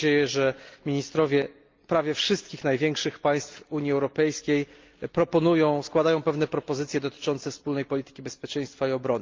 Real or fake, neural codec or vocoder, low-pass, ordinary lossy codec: real; none; 7.2 kHz; Opus, 24 kbps